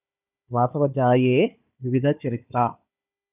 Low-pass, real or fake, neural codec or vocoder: 3.6 kHz; fake; codec, 16 kHz, 4 kbps, FunCodec, trained on Chinese and English, 50 frames a second